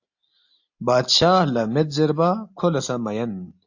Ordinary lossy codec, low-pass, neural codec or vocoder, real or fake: AAC, 48 kbps; 7.2 kHz; none; real